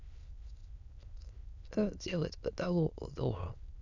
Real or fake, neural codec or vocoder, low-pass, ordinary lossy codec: fake; autoencoder, 22.05 kHz, a latent of 192 numbers a frame, VITS, trained on many speakers; 7.2 kHz; none